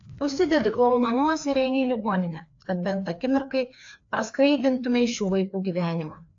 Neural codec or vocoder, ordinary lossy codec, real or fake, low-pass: codec, 16 kHz, 2 kbps, FreqCodec, larger model; AAC, 48 kbps; fake; 7.2 kHz